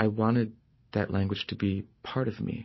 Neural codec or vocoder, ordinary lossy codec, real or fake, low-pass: none; MP3, 24 kbps; real; 7.2 kHz